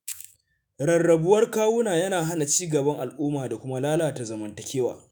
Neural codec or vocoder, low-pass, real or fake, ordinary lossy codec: autoencoder, 48 kHz, 128 numbers a frame, DAC-VAE, trained on Japanese speech; none; fake; none